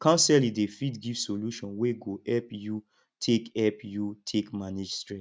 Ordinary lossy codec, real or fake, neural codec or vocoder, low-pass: none; real; none; none